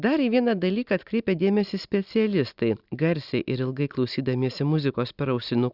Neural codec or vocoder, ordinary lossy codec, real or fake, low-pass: none; Opus, 64 kbps; real; 5.4 kHz